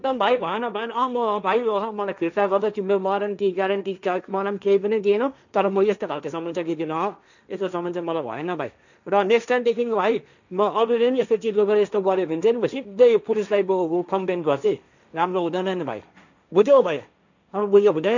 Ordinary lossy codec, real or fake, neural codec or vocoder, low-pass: none; fake; codec, 16 kHz, 1.1 kbps, Voila-Tokenizer; 7.2 kHz